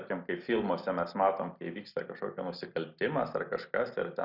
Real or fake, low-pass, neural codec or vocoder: real; 5.4 kHz; none